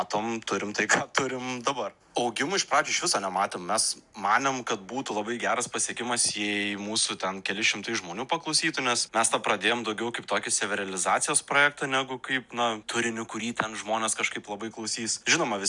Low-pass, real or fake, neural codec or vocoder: 10.8 kHz; real; none